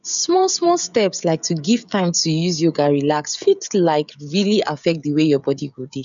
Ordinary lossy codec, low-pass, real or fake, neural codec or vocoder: none; 7.2 kHz; fake; codec, 16 kHz, 16 kbps, FreqCodec, smaller model